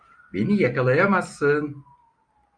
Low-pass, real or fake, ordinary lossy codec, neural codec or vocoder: 9.9 kHz; real; Opus, 64 kbps; none